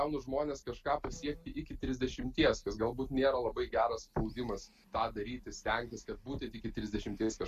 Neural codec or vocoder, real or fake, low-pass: none; real; 14.4 kHz